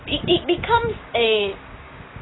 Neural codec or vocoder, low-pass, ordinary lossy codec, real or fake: codec, 16 kHz, 6 kbps, DAC; 7.2 kHz; AAC, 16 kbps; fake